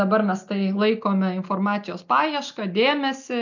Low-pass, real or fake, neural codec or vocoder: 7.2 kHz; real; none